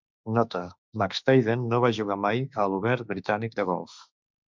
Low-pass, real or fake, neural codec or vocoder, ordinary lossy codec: 7.2 kHz; fake; autoencoder, 48 kHz, 32 numbers a frame, DAC-VAE, trained on Japanese speech; MP3, 64 kbps